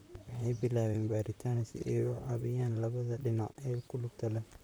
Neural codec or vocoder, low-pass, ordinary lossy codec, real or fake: vocoder, 44.1 kHz, 128 mel bands, Pupu-Vocoder; none; none; fake